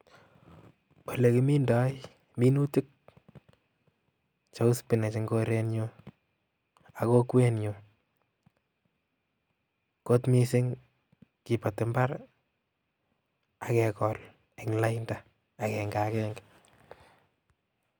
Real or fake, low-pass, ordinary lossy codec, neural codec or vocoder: real; none; none; none